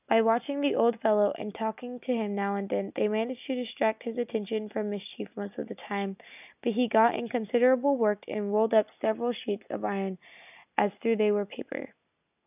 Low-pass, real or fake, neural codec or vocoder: 3.6 kHz; real; none